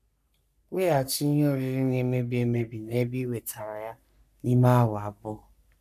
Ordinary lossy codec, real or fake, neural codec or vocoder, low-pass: AAC, 96 kbps; fake; codec, 44.1 kHz, 3.4 kbps, Pupu-Codec; 14.4 kHz